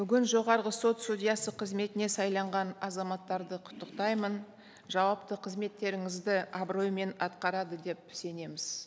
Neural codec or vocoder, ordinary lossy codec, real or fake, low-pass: none; none; real; none